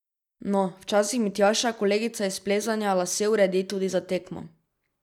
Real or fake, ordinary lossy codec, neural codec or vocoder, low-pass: real; none; none; 19.8 kHz